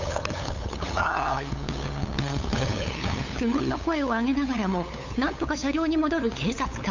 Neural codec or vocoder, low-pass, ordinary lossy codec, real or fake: codec, 16 kHz, 8 kbps, FunCodec, trained on LibriTTS, 25 frames a second; 7.2 kHz; none; fake